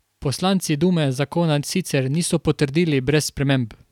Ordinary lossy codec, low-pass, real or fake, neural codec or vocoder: none; 19.8 kHz; real; none